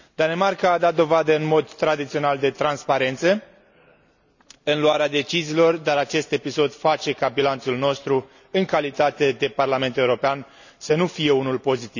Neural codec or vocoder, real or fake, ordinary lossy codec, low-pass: none; real; none; 7.2 kHz